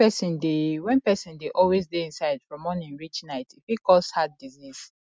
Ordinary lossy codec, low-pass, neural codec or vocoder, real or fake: none; none; none; real